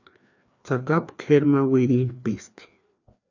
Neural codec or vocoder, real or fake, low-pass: codec, 16 kHz, 2 kbps, FreqCodec, larger model; fake; 7.2 kHz